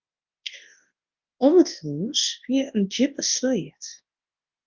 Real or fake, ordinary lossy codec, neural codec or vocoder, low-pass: fake; Opus, 32 kbps; codec, 24 kHz, 0.9 kbps, WavTokenizer, large speech release; 7.2 kHz